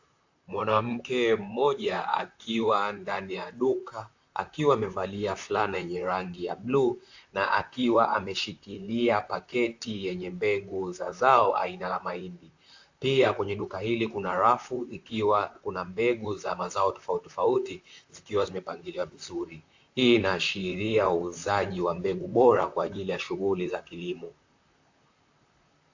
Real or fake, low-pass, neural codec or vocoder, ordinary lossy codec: fake; 7.2 kHz; vocoder, 44.1 kHz, 128 mel bands, Pupu-Vocoder; AAC, 48 kbps